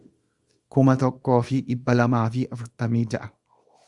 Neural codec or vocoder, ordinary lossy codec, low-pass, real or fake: codec, 24 kHz, 0.9 kbps, WavTokenizer, small release; Opus, 64 kbps; 10.8 kHz; fake